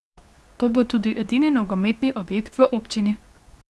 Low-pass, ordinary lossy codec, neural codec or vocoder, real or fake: none; none; codec, 24 kHz, 0.9 kbps, WavTokenizer, medium speech release version 2; fake